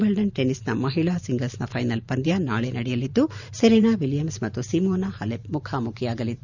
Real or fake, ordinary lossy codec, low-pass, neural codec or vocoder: fake; none; 7.2 kHz; vocoder, 44.1 kHz, 128 mel bands every 512 samples, BigVGAN v2